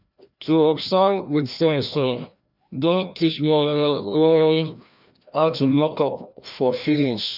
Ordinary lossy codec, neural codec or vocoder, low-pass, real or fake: none; codec, 16 kHz, 1 kbps, FreqCodec, larger model; 5.4 kHz; fake